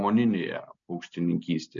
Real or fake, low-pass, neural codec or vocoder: real; 7.2 kHz; none